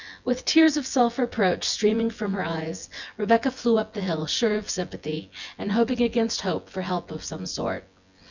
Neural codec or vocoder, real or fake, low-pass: vocoder, 24 kHz, 100 mel bands, Vocos; fake; 7.2 kHz